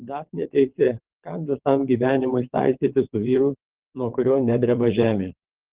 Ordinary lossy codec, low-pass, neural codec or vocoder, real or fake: Opus, 16 kbps; 3.6 kHz; vocoder, 22.05 kHz, 80 mel bands, WaveNeXt; fake